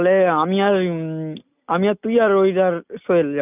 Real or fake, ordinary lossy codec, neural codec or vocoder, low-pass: real; none; none; 3.6 kHz